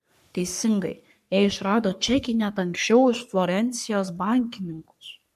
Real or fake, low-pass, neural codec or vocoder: fake; 14.4 kHz; codec, 44.1 kHz, 3.4 kbps, Pupu-Codec